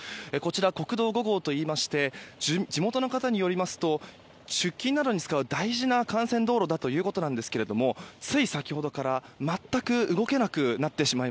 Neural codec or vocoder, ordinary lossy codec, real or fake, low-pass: none; none; real; none